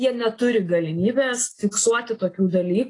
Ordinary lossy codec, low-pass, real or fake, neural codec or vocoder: AAC, 32 kbps; 10.8 kHz; real; none